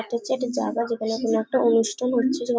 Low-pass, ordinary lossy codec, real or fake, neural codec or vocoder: none; none; real; none